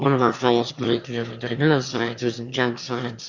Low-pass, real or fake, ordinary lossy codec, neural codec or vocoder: 7.2 kHz; fake; Opus, 64 kbps; autoencoder, 22.05 kHz, a latent of 192 numbers a frame, VITS, trained on one speaker